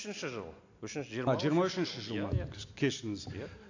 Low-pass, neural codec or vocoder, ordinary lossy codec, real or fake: 7.2 kHz; none; AAC, 48 kbps; real